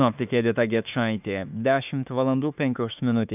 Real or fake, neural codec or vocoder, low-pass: fake; autoencoder, 48 kHz, 32 numbers a frame, DAC-VAE, trained on Japanese speech; 3.6 kHz